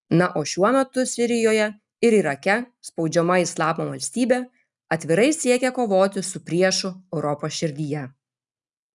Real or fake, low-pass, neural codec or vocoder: real; 10.8 kHz; none